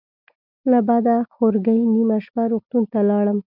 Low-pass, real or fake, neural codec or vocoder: 5.4 kHz; real; none